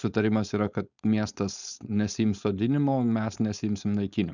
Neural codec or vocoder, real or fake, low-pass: codec, 16 kHz, 4.8 kbps, FACodec; fake; 7.2 kHz